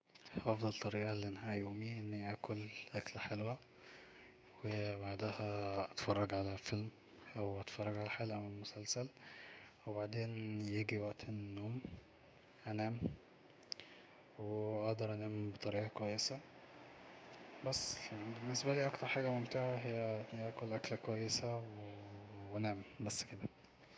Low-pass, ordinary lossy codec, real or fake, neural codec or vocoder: none; none; fake; codec, 16 kHz, 6 kbps, DAC